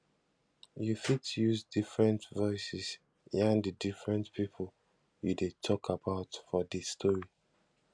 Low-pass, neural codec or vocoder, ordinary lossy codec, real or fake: 9.9 kHz; none; none; real